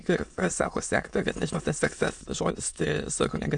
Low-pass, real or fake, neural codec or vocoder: 9.9 kHz; fake; autoencoder, 22.05 kHz, a latent of 192 numbers a frame, VITS, trained on many speakers